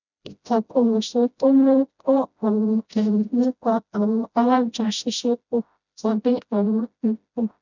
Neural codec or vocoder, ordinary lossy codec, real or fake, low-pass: codec, 16 kHz, 0.5 kbps, FreqCodec, smaller model; none; fake; 7.2 kHz